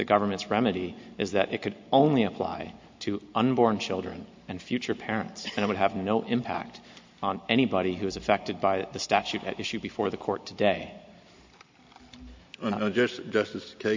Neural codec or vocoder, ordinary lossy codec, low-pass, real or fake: none; MP3, 48 kbps; 7.2 kHz; real